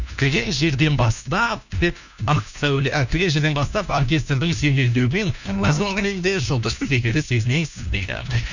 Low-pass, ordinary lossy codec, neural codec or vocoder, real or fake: 7.2 kHz; none; codec, 16 kHz, 1 kbps, FunCodec, trained on LibriTTS, 50 frames a second; fake